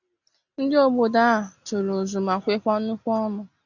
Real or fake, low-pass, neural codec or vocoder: real; 7.2 kHz; none